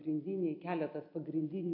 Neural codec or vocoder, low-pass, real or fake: none; 5.4 kHz; real